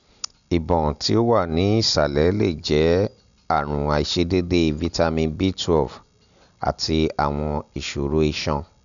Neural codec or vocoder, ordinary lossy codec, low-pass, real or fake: none; none; 7.2 kHz; real